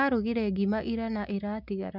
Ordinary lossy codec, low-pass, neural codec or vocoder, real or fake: none; 5.4 kHz; none; real